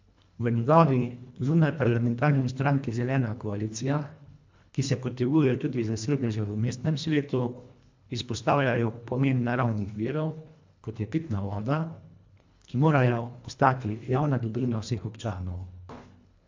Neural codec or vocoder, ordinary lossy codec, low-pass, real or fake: codec, 24 kHz, 1.5 kbps, HILCodec; MP3, 64 kbps; 7.2 kHz; fake